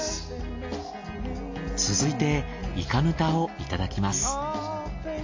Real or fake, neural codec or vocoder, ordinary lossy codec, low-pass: real; none; AAC, 48 kbps; 7.2 kHz